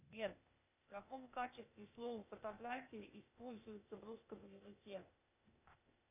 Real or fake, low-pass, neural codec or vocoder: fake; 3.6 kHz; codec, 16 kHz, 0.8 kbps, ZipCodec